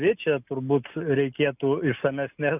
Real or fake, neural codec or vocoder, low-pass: real; none; 3.6 kHz